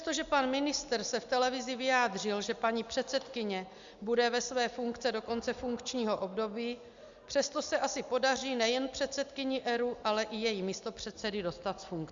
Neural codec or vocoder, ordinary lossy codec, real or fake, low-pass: none; Opus, 64 kbps; real; 7.2 kHz